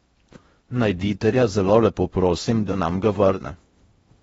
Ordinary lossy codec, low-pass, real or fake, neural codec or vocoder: AAC, 24 kbps; 10.8 kHz; fake; codec, 16 kHz in and 24 kHz out, 0.6 kbps, FocalCodec, streaming, 4096 codes